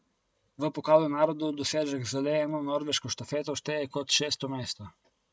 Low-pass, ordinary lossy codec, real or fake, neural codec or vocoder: none; none; real; none